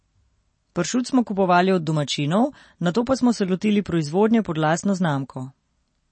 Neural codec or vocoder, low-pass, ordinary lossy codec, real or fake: none; 9.9 kHz; MP3, 32 kbps; real